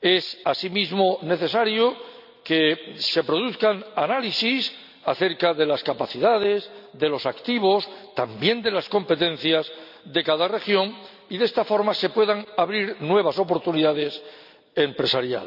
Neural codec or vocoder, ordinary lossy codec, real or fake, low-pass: none; none; real; 5.4 kHz